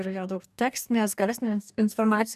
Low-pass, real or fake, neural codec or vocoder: 14.4 kHz; fake; codec, 44.1 kHz, 2.6 kbps, DAC